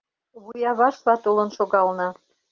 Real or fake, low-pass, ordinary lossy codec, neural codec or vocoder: real; 7.2 kHz; Opus, 32 kbps; none